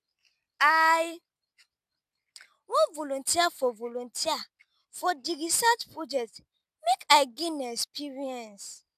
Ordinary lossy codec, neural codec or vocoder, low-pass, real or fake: none; none; 14.4 kHz; real